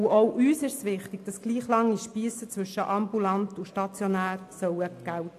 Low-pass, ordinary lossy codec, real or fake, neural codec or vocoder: 14.4 kHz; AAC, 64 kbps; real; none